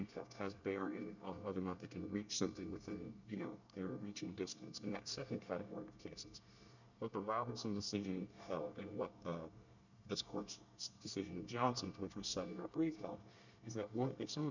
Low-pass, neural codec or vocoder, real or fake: 7.2 kHz; codec, 24 kHz, 1 kbps, SNAC; fake